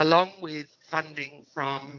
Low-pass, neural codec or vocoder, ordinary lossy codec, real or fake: 7.2 kHz; vocoder, 22.05 kHz, 80 mel bands, WaveNeXt; AAC, 48 kbps; fake